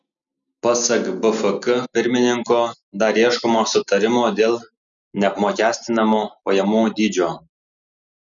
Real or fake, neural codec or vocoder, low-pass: real; none; 7.2 kHz